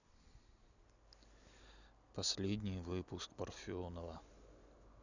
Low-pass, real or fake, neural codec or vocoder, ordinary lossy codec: 7.2 kHz; real; none; none